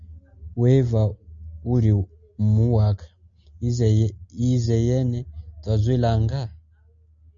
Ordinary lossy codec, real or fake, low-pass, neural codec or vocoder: MP3, 64 kbps; real; 7.2 kHz; none